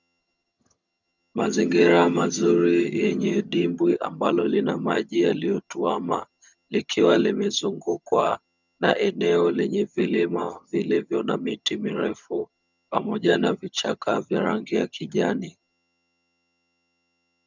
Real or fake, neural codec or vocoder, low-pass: fake; vocoder, 22.05 kHz, 80 mel bands, HiFi-GAN; 7.2 kHz